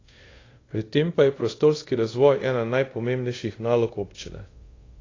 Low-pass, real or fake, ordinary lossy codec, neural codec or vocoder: 7.2 kHz; fake; AAC, 32 kbps; codec, 24 kHz, 0.5 kbps, DualCodec